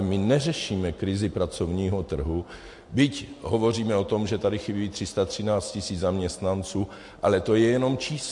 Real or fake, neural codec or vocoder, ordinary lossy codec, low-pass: real; none; MP3, 48 kbps; 10.8 kHz